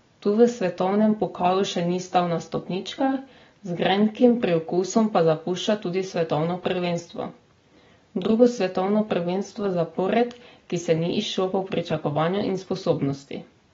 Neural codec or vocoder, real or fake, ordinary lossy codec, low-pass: none; real; AAC, 24 kbps; 7.2 kHz